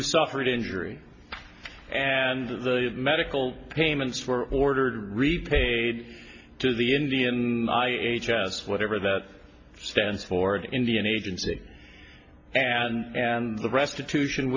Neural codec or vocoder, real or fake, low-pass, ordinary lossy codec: none; real; 7.2 kHz; AAC, 48 kbps